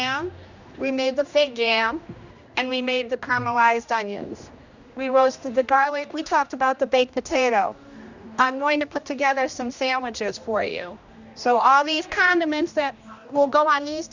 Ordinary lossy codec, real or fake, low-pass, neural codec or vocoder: Opus, 64 kbps; fake; 7.2 kHz; codec, 16 kHz, 1 kbps, X-Codec, HuBERT features, trained on general audio